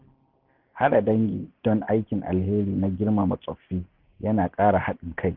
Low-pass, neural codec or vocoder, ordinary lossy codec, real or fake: 5.4 kHz; none; Opus, 32 kbps; real